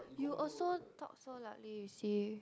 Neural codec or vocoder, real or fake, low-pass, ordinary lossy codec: none; real; none; none